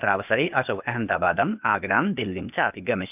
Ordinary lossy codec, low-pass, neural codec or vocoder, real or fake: none; 3.6 kHz; codec, 16 kHz, 0.8 kbps, ZipCodec; fake